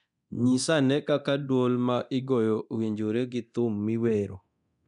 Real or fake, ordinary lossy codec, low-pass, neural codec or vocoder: fake; none; 10.8 kHz; codec, 24 kHz, 0.9 kbps, DualCodec